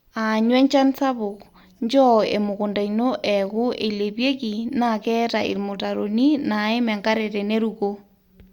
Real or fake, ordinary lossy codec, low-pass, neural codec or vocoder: real; Opus, 64 kbps; 19.8 kHz; none